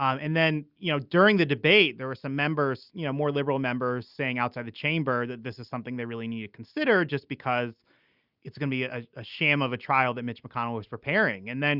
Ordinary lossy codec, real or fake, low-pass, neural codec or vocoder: Opus, 64 kbps; real; 5.4 kHz; none